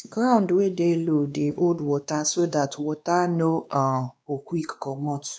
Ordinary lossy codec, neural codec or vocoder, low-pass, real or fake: none; codec, 16 kHz, 2 kbps, X-Codec, WavLM features, trained on Multilingual LibriSpeech; none; fake